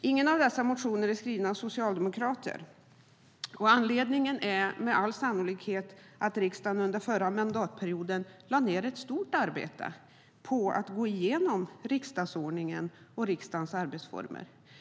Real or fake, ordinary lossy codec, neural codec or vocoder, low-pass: real; none; none; none